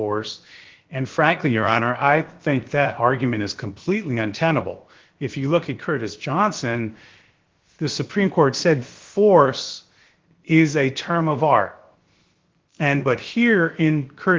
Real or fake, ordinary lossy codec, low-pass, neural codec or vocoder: fake; Opus, 16 kbps; 7.2 kHz; codec, 16 kHz, about 1 kbps, DyCAST, with the encoder's durations